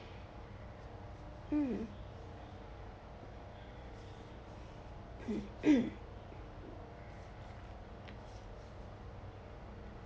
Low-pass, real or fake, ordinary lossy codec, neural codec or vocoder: none; real; none; none